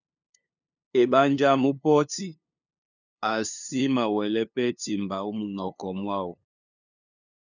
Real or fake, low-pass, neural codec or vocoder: fake; 7.2 kHz; codec, 16 kHz, 2 kbps, FunCodec, trained on LibriTTS, 25 frames a second